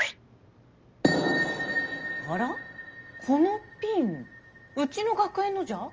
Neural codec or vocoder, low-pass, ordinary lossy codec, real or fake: none; 7.2 kHz; Opus, 24 kbps; real